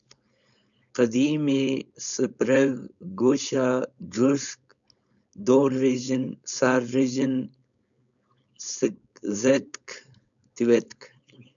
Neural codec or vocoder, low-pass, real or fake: codec, 16 kHz, 4.8 kbps, FACodec; 7.2 kHz; fake